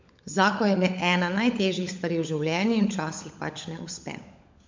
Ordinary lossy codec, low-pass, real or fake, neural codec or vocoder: MP3, 48 kbps; 7.2 kHz; fake; codec, 16 kHz, 16 kbps, FunCodec, trained on LibriTTS, 50 frames a second